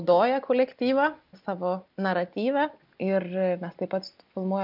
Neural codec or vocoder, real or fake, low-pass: none; real; 5.4 kHz